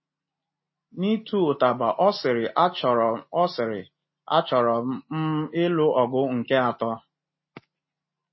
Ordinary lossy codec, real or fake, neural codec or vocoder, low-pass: MP3, 24 kbps; real; none; 7.2 kHz